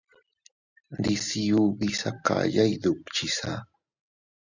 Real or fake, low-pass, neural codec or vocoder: real; 7.2 kHz; none